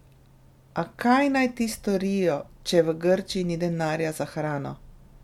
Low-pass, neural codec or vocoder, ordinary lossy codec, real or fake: 19.8 kHz; none; MP3, 96 kbps; real